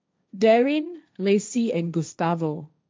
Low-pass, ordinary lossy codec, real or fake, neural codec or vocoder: none; none; fake; codec, 16 kHz, 1.1 kbps, Voila-Tokenizer